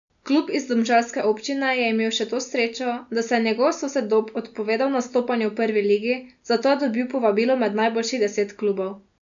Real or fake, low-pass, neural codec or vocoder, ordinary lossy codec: real; 7.2 kHz; none; MP3, 96 kbps